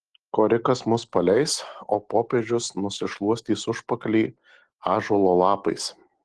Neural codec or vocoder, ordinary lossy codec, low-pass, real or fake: none; Opus, 16 kbps; 10.8 kHz; real